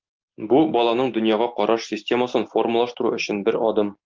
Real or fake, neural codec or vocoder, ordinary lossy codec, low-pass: real; none; Opus, 24 kbps; 7.2 kHz